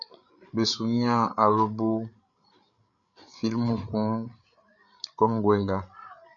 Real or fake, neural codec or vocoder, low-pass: fake; codec, 16 kHz, 8 kbps, FreqCodec, larger model; 7.2 kHz